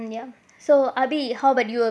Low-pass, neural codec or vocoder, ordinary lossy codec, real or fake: none; none; none; real